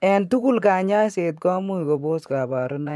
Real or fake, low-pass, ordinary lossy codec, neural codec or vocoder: fake; none; none; vocoder, 24 kHz, 100 mel bands, Vocos